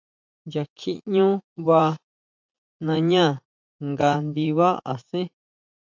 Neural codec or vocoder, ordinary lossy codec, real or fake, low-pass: vocoder, 44.1 kHz, 80 mel bands, Vocos; MP3, 64 kbps; fake; 7.2 kHz